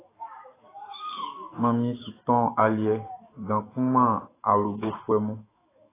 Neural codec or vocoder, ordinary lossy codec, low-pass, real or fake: autoencoder, 48 kHz, 128 numbers a frame, DAC-VAE, trained on Japanese speech; AAC, 16 kbps; 3.6 kHz; fake